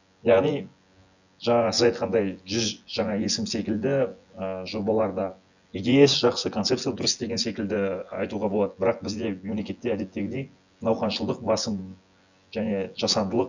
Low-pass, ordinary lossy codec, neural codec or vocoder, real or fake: 7.2 kHz; none; vocoder, 24 kHz, 100 mel bands, Vocos; fake